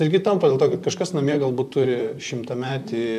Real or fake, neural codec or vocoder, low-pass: fake; vocoder, 44.1 kHz, 128 mel bands, Pupu-Vocoder; 14.4 kHz